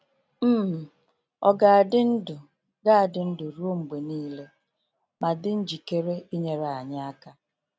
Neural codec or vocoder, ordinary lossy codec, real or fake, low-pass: none; none; real; none